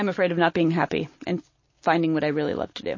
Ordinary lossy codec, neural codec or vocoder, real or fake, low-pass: MP3, 32 kbps; none; real; 7.2 kHz